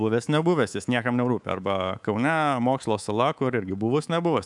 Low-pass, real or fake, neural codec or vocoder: 10.8 kHz; fake; codec, 24 kHz, 3.1 kbps, DualCodec